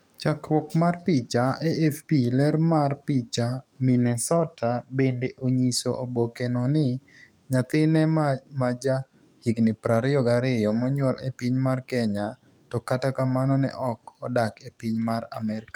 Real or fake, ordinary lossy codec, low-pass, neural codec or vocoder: fake; none; 19.8 kHz; codec, 44.1 kHz, 7.8 kbps, DAC